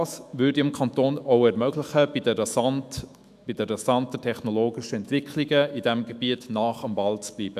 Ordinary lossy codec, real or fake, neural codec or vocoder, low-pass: none; fake; autoencoder, 48 kHz, 128 numbers a frame, DAC-VAE, trained on Japanese speech; 14.4 kHz